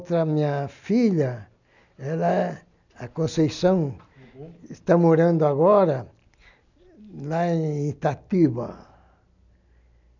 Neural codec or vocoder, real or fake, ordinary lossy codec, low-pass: none; real; none; 7.2 kHz